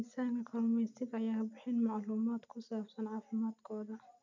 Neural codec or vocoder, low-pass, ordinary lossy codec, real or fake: none; 7.2 kHz; none; real